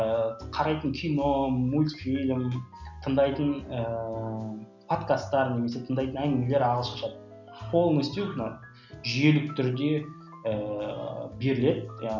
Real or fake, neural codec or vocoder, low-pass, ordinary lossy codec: real; none; 7.2 kHz; none